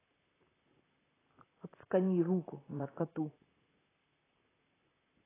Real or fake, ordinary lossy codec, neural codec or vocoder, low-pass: fake; AAC, 16 kbps; vocoder, 44.1 kHz, 128 mel bands, Pupu-Vocoder; 3.6 kHz